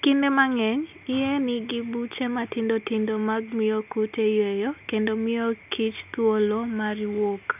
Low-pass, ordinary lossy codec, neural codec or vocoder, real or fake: 3.6 kHz; none; none; real